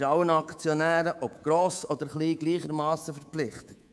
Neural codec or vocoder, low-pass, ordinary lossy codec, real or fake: codec, 24 kHz, 3.1 kbps, DualCodec; none; none; fake